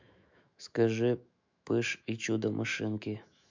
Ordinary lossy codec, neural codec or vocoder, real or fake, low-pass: MP3, 64 kbps; autoencoder, 48 kHz, 128 numbers a frame, DAC-VAE, trained on Japanese speech; fake; 7.2 kHz